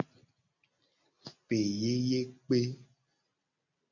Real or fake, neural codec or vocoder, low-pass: real; none; 7.2 kHz